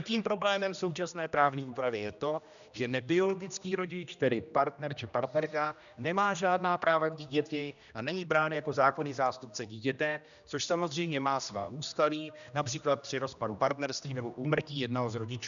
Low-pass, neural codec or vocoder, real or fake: 7.2 kHz; codec, 16 kHz, 1 kbps, X-Codec, HuBERT features, trained on general audio; fake